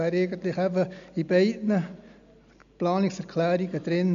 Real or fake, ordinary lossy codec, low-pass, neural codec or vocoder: real; MP3, 96 kbps; 7.2 kHz; none